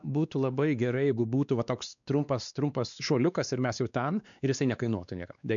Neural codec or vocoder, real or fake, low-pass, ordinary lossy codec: codec, 16 kHz, 2 kbps, X-Codec, WavLM features, trained on Multilingual LibriSpeech; fake; 7.2 kHz; MP3, 96 kbps